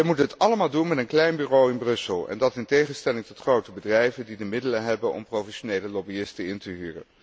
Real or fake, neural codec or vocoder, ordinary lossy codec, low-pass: real; none; none; none